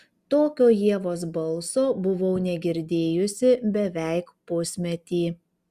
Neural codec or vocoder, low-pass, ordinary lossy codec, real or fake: none; 14.4 kHz; Opus, 64 kbps; real